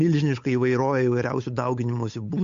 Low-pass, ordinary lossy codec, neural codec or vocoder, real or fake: 7.2 kHz; AAC, 64 kbps; codec, 16 kHz, 8 kbps, FunCodec, trained on Chinese and English, 25 frames a second; fake